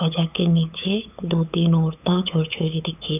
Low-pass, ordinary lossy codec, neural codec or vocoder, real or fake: 3.6 kHz; none; codec, 16 kHz, 8 kbps, FunCodec, trained on LibriTTS, 25 frames a second; fake